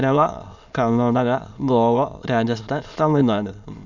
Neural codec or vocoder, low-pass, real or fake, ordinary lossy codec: autoencoder, 22.05 kHz, a latent of 192 numbers a frame, VITS, trained on many speakers; 7.2 kHz; fake; none